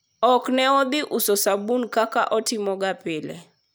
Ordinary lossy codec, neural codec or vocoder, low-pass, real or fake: none; none; none; real